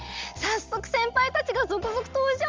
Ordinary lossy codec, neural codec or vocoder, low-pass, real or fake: Opus, 32 kbps; none; 7.2 kHz; real